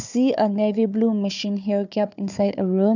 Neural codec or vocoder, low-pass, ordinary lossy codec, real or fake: codec, 16 kHz, 4 kbps, FunCodec, trained on Chinese and English, 50 frames a second; 7.2 kHz; none; fake